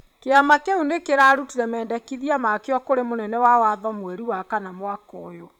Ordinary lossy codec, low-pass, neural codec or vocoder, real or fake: none; 19.8 kHz; vocoder, 44.1 kHz, 128 mel bands, Pupu-Vocoder; fake